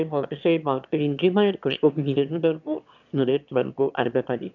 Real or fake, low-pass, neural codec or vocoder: fake; 7.2 kHz; autoencoder, 22.05 kHz, a latent of 192 numbers a frame, VITS, trained on one speaker